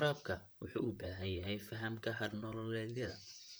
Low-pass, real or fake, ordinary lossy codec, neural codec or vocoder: none; fake; none; vocoder, 44.1 kHz, 128 mel bands, Pupu-Vocoder